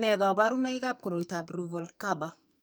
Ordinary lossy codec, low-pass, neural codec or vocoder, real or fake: none; none; codec, 44.1 kHz, 2.6 kbps, SNAC; fake